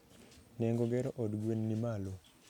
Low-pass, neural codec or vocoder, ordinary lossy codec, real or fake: 19.8 kHz; none; none; real